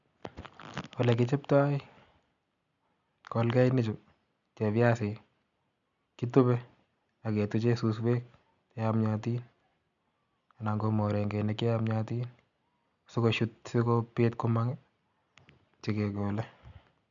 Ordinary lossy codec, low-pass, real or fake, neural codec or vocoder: none; 7.2 kHz; real; none